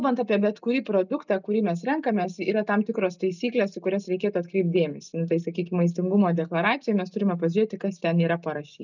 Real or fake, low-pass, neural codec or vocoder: real; 7.2 kHz; none